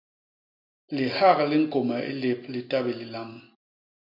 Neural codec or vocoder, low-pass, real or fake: none; 5.4 kHz; real